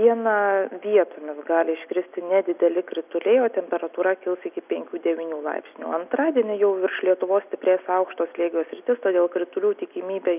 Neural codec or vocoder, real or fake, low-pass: none; real; 3.6 kHz